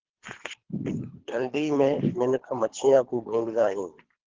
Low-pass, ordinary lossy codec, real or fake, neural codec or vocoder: 7.2 kHz; Opus, 16 kbps; fake; codec, 24 kHz, 3 kbps, HILCodec